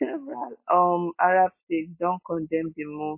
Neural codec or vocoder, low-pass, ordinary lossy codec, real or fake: none; 3.6 kHz; MP3, 24 kbps; real